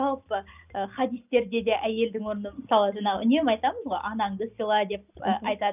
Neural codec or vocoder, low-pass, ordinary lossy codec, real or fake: none; 3.6 kHz; none; real